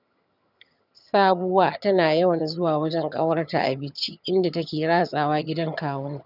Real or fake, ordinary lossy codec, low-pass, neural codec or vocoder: fake; none; 5.4 kHz; vocoder, 22.05 kHz, 80 mel bands, HiFi-GAN